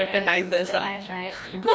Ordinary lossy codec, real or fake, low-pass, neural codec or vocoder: none; fake; none; codec, 16 kHz, 1 kbps, FreqCodec, larger model